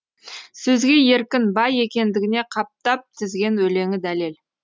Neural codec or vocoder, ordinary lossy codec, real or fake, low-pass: none; none; real; none